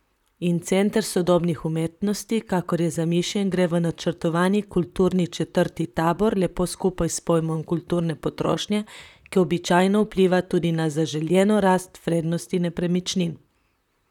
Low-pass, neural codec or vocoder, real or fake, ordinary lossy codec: 19.8 kHz; vocoder, 44.1 kHz, 128 mel bands, Pupu-Vocoder; fake; none